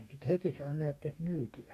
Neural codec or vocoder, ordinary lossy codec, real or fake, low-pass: codec, 44.1 kHz, 2.6 kbps, DAC; AAC, 64 kbps; fake; 14.4 kHz